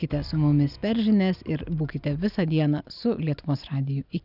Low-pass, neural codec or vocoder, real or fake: 5.4 kHz; none; real